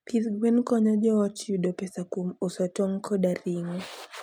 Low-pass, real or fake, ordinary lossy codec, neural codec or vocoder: 19.8 kHz; real; MP3, 96 kbps; none